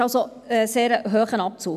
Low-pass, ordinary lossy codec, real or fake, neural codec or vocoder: 14.4 kHz; none; real; none